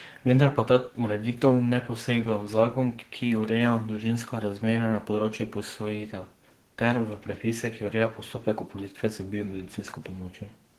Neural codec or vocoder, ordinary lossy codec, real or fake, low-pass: codec, 32 kHz, 1.9 kbps, SNAC; Opus, 16 kbps; fake; 14.4 kHz